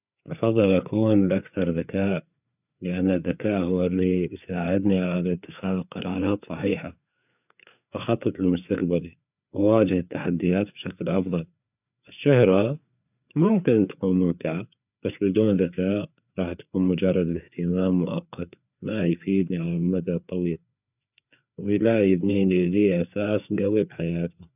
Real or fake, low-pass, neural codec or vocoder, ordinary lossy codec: fake; 3.6 kHz; codec, 16 kHz, 4 kbps, FreqCodec, larger model; none